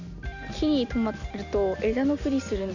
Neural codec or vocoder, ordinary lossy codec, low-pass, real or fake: none; none; 7.2 kHz; real